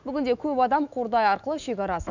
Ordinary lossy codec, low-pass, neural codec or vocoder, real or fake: none; 7.2 kHz; autoencoder, 48 kHz, 128 numbers a frame, DAC-VAE, trained on Japanese speech; fake